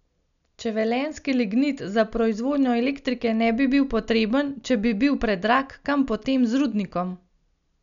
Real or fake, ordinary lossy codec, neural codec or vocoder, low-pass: real; none; none; 7.2 kHz